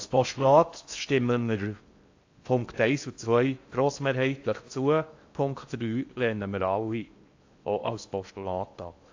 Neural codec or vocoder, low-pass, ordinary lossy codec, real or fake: codec, 16 kHz in and 24 kHz out, 0.6 kbps, FocalCodec, streaming, 4096 codes; 7.2 kHz; AAC, 48 kbps; fake